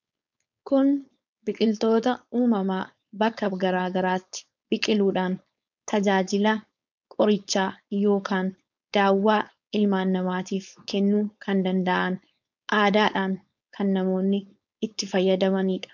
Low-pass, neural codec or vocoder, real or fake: 7.2 kHz; codec, 16 kHz, 4.8 kbps, FACodec; fake